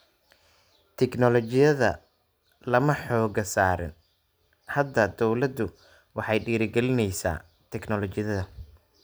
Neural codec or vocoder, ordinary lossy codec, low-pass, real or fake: none; none; none; real